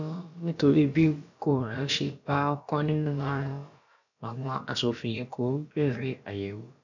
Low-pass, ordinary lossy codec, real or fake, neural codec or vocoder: 7.2 kHz; none; fake; codec, 16 kHz, about 1 kbps, DyCAST, with the encoder's durations